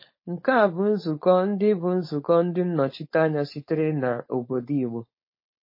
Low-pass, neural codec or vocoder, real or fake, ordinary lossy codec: 5.4 kHz; codec, 16 kHz, 4.8 kbps, FACodec; fake; MP3, 24 kbps